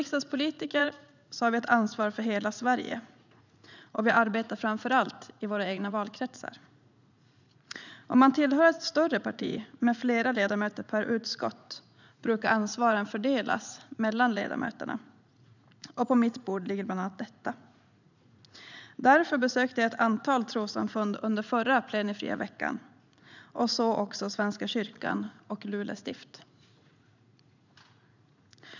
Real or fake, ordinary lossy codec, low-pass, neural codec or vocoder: fake; none; 7.2 kHz; vocoder, 44.1 kHz, 80 mel bands, Vocos